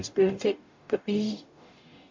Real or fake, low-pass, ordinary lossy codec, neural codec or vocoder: fake; 7.2 kHz; MP3, 48 kbps; codec, 44.1 kHz, 0.9 kbps, DAC